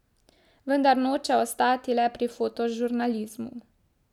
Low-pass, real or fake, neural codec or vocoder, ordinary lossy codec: 19.8 kHz; real; none; none